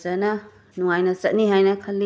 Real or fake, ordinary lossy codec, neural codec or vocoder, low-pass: real; none; none; none